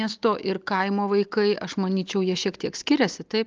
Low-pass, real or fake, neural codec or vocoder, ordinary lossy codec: 7.2 kHz; real; none; Opus, 24 kbps